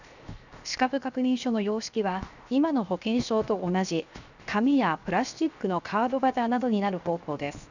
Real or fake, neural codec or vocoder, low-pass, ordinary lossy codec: fake; codec, 16 kHz, 0.7 kbps, FocalCodec; 7.2 kHz; none